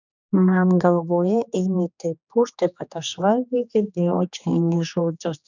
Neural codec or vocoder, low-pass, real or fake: codec, 16 kHz, 2 kbps, X-Codec, HuBERT features, trained on general audio; 7.2 kHz; fake